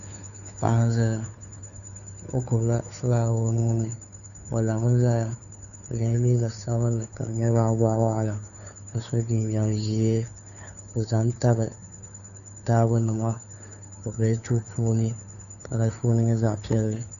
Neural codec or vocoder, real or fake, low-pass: codec, 16 kHz, 2 kbps, FunCodec, trained on Chinese and English, 25 frames a second; fake; 7.2 kHz